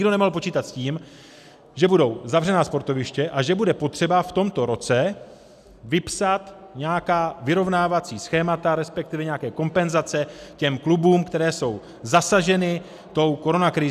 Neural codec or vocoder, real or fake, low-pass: none; real; 14.4 kHz